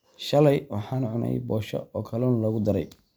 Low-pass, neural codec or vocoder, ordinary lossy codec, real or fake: none; none; none; real